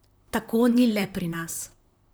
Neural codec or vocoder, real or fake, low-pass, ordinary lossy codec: vocoder, 44.1 kHz, 128 mel bands, Pupu-Vocoder; fake; none; none